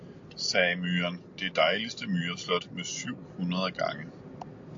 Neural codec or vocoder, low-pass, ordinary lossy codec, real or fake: none; 7.2 kHz; AAC, 48 kbps; real